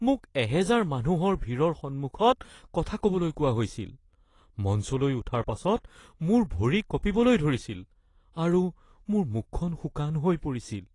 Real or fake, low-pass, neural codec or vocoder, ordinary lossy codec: real; 10.8 kHz; none; AAC, 32 kbps